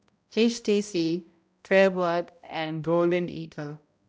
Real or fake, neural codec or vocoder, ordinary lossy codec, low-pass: fake; codec, 16 kHz, 0.5 kbps, X-Codec, HuBERT features, trained on balanced general audio; none; none